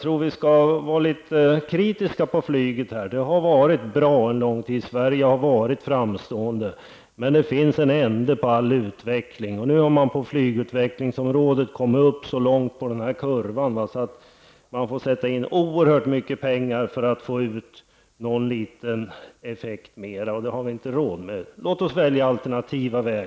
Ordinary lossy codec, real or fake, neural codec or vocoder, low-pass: none; real; none; none